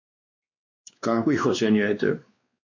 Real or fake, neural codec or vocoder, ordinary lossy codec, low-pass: fake; codec, 16 kHz, 2 kbps, X-Codec, WavLM features, trained on Multilingual LibriSpeech; AAC, 48 kbps; 7.2 kHz